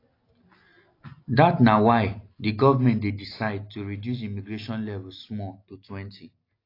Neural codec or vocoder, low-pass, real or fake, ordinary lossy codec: none; 5.4 kHz; real; AAC, 32 kbps